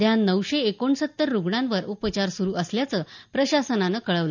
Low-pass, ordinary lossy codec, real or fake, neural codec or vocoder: 7.2 kHz; none; real; none